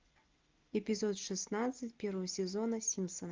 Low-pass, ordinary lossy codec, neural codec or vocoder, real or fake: 7.2 kHz; Opus, 32 kbps; none; real